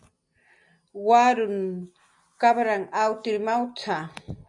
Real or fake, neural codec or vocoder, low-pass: real; none; 10.8 kHz